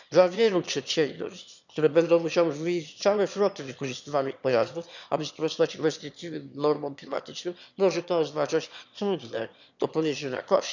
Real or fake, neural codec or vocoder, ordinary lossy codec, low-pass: fake; autoencoder, 22.05 kHz, a latent of 192 numbers a frame, VITS, trained on one speaker; none; 7.2 kHz